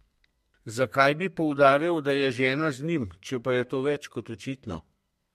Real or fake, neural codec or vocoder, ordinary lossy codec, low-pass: fake; codec, 32 kHz, 1.9 kbps, SNAC; MP3, 64 kbps; 14.4 kHz